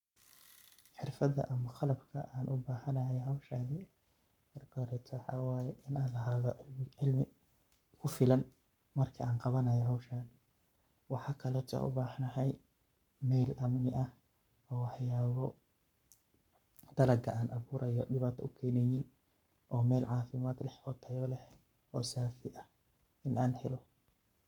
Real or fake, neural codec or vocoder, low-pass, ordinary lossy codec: fake; codec, 44.1 kHz, 7.8 kbps, Pupu-Codec; 19.8 kHz; none